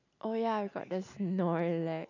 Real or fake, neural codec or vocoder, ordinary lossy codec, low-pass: real; none; none; 7.2 kHz